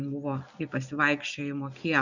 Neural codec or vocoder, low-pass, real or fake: none; 7.2 kHz; real